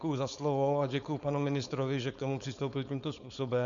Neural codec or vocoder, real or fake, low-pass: codec, 16 kHz, 4.8 kbps, FACodec; fake; 7.2 kHz